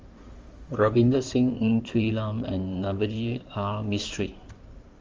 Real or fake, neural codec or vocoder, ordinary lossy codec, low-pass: fake; codec, 16 kHz in and 24 kHz out, 2.2 kbps, FireRedTTS-2 codec; Opus, 32 kbps; 7.2 kHz